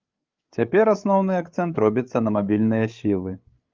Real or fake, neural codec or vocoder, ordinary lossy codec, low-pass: fake; codec, 16 kHz, 8 kbps, FreqCodec, larger model; Opus, 32 kbps; 7.2 kHz